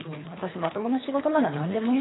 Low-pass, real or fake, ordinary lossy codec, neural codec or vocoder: 7.2 kHz; fake; AAC, 16 kbps; codec, 24 kHz, 3 kbps, HILCodec